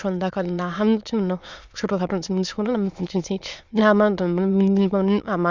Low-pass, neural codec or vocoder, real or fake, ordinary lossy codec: 7.2 kHz; autoencoder, 22.05 kHz, a latent of 192 numbers a frame, VITS, trained on many speakers; fake; Opus, 64 kbps